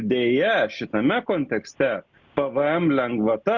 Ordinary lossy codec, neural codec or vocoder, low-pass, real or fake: Opus, 64 kbps; none; 7.2 kHz; real